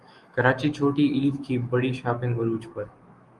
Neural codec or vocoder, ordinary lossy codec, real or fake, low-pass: autoencoder, 48 kHz, 128 numbers a frame, DAC-VAE, trained on Japanese speech; Opus, 32 kbps; fake; 10.8 kHz